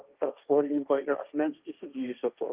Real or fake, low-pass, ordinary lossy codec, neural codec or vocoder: fake; 3.6 kHz; Opus, 32 kbps; codec, 16 kHz, 1.1 kbps, Voila-Tokenizer